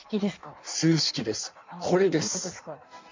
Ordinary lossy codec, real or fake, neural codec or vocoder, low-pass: MP3, 64 kbps; fake; codec, 16 kHz in and 24 kHz out, 1.1 kbps, FireRedTTS-2 codec; 7.2 kHz